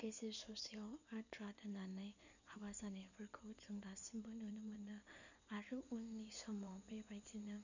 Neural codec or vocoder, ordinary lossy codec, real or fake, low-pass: codec, 16 kHz in and 24 kHz out, 2.2 kbps, FireRedTTS-2 codec; none; fake; 7.2 kHz